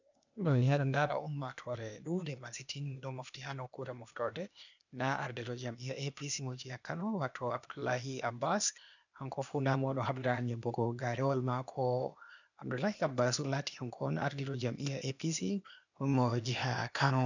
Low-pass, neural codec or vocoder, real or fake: 7.2 kHz; codec, 16 kHz, 0.8 kbps, ZipCodec; fake